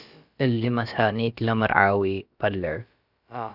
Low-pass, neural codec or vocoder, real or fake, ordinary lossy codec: 5.4 kHz; codec, 16 kHz, about 1 kbps, DyCAST, with the encoder's durations; fake; Opus, 64 kbps